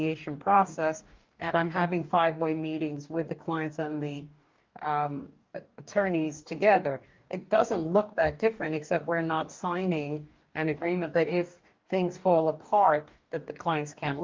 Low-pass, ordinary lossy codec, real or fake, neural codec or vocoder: 7.2 kHz; Opus, 24 kbps; fake; codec, 44.1 kHz, 2.6 kbps, DAC